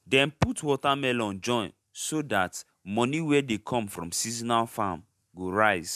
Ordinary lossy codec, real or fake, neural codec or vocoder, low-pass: MP3, 96 kbps; real; none; 14.4 kHz